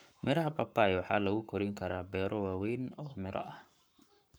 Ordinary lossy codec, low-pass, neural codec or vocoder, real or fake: none; none; codec, 44.1 kHz, 7.8 kbps, Pupu-Codec; fake